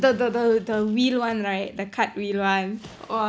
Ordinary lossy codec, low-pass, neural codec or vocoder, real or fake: none; none; none; real